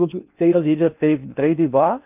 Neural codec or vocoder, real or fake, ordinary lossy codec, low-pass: codec, 16 kHz in and 24 kHz out, 0.6 kbps, FocalCodec, streaming, 4096 codes; fake; none; 3.6 kHz